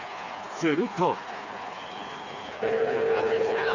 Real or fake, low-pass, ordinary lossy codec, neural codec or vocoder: fake; 7.2 kHz; none; codec, 16 kHz, 4 kbps, FreqCodec, smaller model